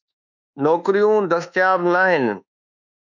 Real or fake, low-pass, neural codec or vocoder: fake; 7.2 kHz; codec, 24 kHz, 1.2 kbps, DualCodec